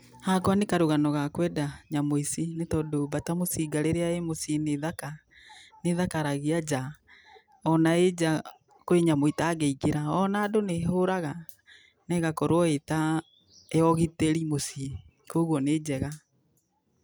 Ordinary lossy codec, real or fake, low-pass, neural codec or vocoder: none; real; none; none